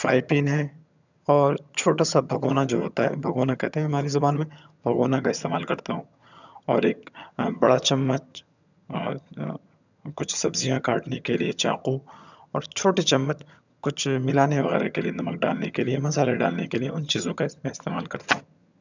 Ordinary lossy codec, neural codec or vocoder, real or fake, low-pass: none; vocoder, 22.05 kHz, 80 mel bands, HiFi-GAN; fake; 7.2 kHz